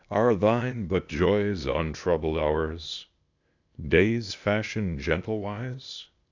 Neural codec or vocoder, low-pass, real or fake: codec, 16 kHz, 0.8 kbps, ZipCodec; 7.2 kHz; fake